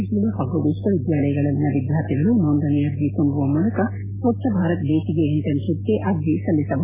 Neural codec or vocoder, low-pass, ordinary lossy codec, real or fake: none; 3.6 kHz; MP3, 16 kbps; real